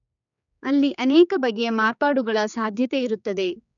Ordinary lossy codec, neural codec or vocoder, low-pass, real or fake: none; codec, 16 kHz, 4 kbps, X-Codec, HuBERT features, trained on general audio; 7.2 kHz; fake